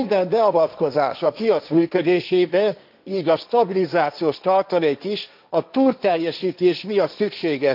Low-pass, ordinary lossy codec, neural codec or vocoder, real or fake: 5.4 kHz; none; codec, 16 kHz, 1.1 kbps, Voila-Tokenizer; fake